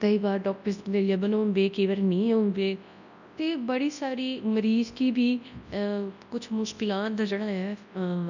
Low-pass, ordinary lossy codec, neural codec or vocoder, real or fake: 7.2 kHz; none; codec, 24 kHz, 0.9 kbps, WavTokenizer, large speech release; fake